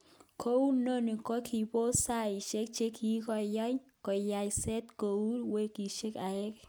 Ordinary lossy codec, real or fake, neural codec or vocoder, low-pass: none; real; none; none